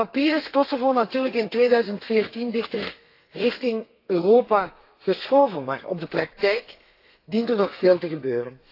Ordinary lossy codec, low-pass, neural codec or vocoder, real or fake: AAC, 32 kbps; 5.4 kHz; codec, 32 kHz, 1.9 kbps, SNAC; fake